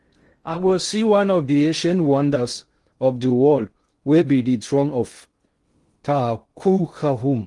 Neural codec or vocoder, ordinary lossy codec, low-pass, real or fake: codec, 16 kHz in and 24 kHz out, 0.6 kbps, FocalCodec, streaming, 2048 codes; Opus, 24 kbps; 10.8 kHz; fake